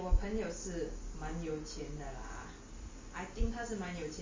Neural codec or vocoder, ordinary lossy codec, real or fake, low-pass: none; MP3, 32 kbps; real; 7.2 kHz